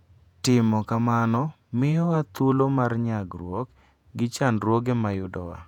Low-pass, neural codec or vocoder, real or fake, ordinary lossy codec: 19.8 kHz; vocoder, 48 kHz, 128 mel bands, Vocos; fake; none